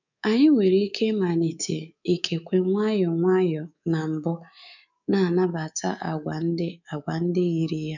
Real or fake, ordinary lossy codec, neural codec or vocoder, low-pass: fake; none; codec, 24 kHz, 3.1 kbps, DualCodec; 7.2 kHz